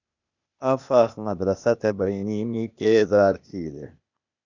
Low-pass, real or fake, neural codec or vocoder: 7.2 kHz; fake; codec, 16 kHz, 0.8 kbps, ZipCodec